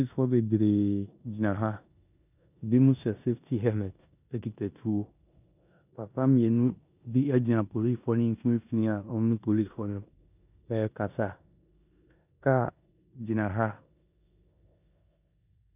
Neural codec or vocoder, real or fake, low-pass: codec, 16 kHz in and 24 kHz out, 0.9 kbps, LongCat-Audio-Codec, four codebook decoder; fake; 3.6 kHz